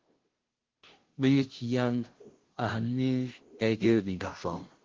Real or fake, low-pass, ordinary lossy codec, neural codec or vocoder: fake; 7.2 kHz; Opus, 16 kbps; codec, 16 kHz, 0.5 kbps, FunCodec, trained on Chinese and English, 25 frames a second